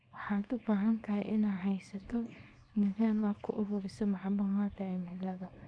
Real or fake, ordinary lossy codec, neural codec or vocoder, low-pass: fake; none; codec, 24 kHz, 0.9 kbps, WavTokenizer, small release; 9.9 kHz